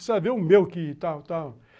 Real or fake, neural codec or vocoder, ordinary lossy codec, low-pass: real; none; none; none